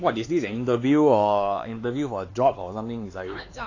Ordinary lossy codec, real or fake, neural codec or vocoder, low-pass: AAC, 32 kbps; fake; codec, 16 kHz, 4 kbps, X-Codec, HuBERT features, trained on LibriSpeech; 7.2 kHz